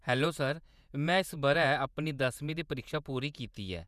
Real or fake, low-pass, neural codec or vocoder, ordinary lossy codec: fake; 14.4 kHz; vocoder, 48 kHz, 128 mel bands, Vocos; none